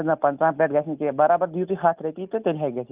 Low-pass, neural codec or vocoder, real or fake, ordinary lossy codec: 3.6 kHz; none; real; Opus, 24 kbps